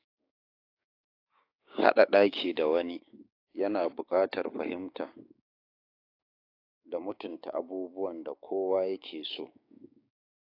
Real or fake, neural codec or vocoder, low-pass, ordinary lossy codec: fake; codec, 16 kHz, 6 kbps, DAC; 5.4 kHz; AAC, 32 kbps